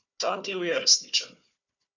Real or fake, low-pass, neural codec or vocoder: fake; 7.2 kHz; codec, 16 kHz, 4 kbps, FunCodec, trained on Chinese and English, 50 frames a second